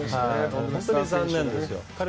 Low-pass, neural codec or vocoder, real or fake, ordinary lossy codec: none; none; real; none